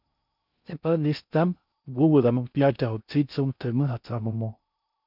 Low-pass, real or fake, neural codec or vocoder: 5.4 kHz; fake; codec, 16 kHz in and 24 kHz out, 0.6 kbps, FocalCodec, streaming, 2048 codes